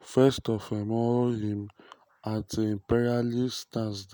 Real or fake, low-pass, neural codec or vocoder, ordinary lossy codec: real; none; none; none